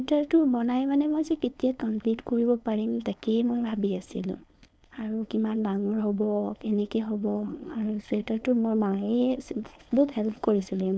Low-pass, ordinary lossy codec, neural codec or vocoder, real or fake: none; none; codec, 16 kHz, 4.8 kbps, FACodec; fake